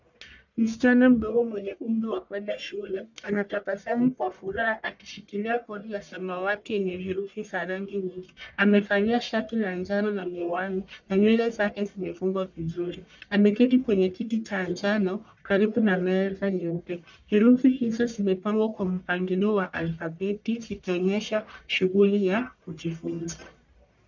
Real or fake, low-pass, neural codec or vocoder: fake; 7.2 kHz; codec, 44.1 kHz, 1.7 kbps, Pupu-Codec